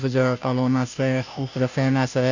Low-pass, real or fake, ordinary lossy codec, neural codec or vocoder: 7.2 kHz; fake; none; codec, 16 kHz, 0.5 kbps, FunCodec, trained on Chinese and English, 25 frames a second